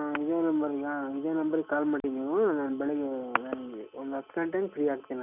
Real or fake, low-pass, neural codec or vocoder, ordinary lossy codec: real; 3.6 kHz; none; none